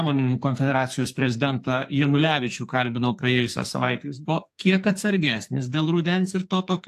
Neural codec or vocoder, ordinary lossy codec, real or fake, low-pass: codec, 44.1 kHz, 2.6 kbps, SNAC; AAC, 64 kbps; fake; 14.4 kHz